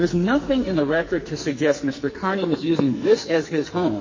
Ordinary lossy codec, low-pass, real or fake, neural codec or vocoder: MP3, 32 kbps; 7.2 kHz; fake; codec, 44.1 kHz, 2.6 kbps, SNAC